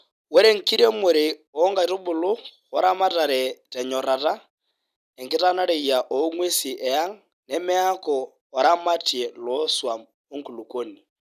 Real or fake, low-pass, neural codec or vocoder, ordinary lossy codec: real; 14.4 kHz; none; none